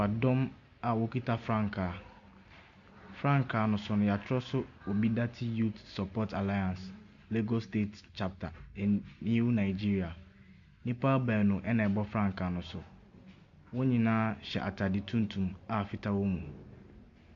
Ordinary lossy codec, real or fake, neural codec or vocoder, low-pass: MP3, 64 kbps; real; none; 7.2 kHz